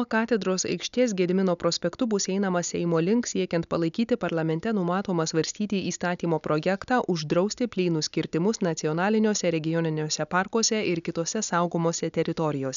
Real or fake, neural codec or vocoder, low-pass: real; none; 7.2 kHz